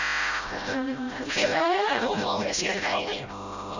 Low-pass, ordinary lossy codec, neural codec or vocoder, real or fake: 7.2 kHz; none; codec, 16 kHz, 0.5 kbps, FreqCodec, smaller model; fake